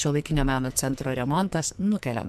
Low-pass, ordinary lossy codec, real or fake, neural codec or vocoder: 14.4 kHz; MP3, 96 kbps; fake; codec, 44.1 kHz, 2.6 kbps, SNAC